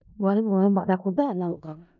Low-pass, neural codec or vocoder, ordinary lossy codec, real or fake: 7.2 kHz; codec, 16 kHz in and 24 kHz out, 0.4 kbps, LongCat-Audio-Codec, four codebook decoder; none; fake